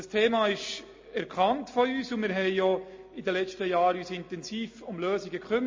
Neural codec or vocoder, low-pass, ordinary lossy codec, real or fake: none; 7.2 kHz; MP3, 32 kbps; real